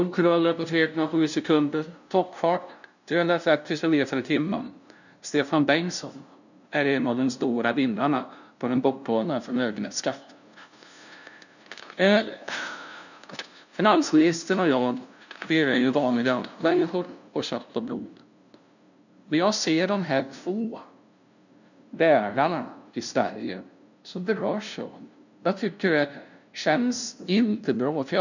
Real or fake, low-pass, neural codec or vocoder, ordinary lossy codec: fake; 7.2 kHz; codec, 16 kHz, 0.5 kbps, FunCodec, trained on LibriTTS, 25 frames a second; none